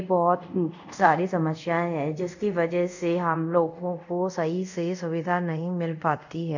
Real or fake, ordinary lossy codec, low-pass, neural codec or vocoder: fake; none; 7.2 kHz; codec, 24 kHz, 0.5 kbps, DualCodec